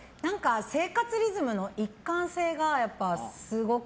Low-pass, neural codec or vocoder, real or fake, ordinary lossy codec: none; none; real; none